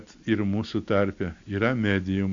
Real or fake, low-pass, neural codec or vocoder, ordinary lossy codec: real; 7.2 kHz; none; AAC, 64 kbps